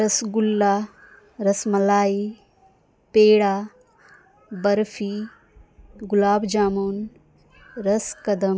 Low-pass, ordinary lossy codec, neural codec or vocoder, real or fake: none; none; none; real